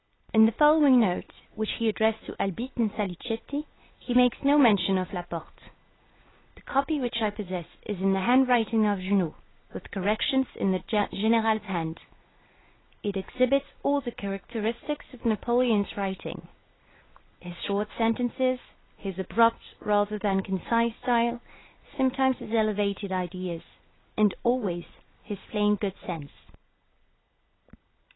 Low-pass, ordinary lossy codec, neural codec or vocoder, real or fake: 7.2 kHz; AAC, 16 kbps; none; real